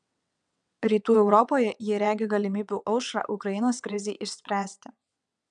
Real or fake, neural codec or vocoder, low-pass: fake; vocoder, 22.05 kHz, 80 mel bands, WaveNeXt; 9.9 kHz